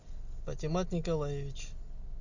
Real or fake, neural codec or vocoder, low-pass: real; none; 7.2 kHz